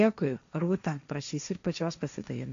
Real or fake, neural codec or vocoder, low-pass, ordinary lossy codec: fake; codec, 16 kHz, 1.1 kbps, Voila-Tokenizer; 7.2 kHz; MP3, 64 kbps